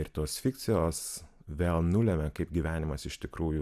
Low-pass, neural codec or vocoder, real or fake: 14.4 kHz; none; real